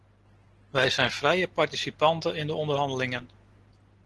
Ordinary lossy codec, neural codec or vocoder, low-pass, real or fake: Opus, 16 kbps; none; 10.8 kHz; real